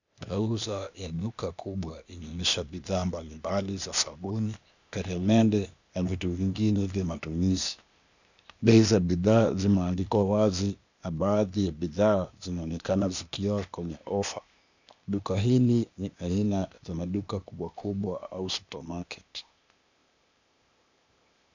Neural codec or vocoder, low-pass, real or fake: codec, 16 kHz, 0.8 kbps, ZipCodec; 7.2 kHz; fake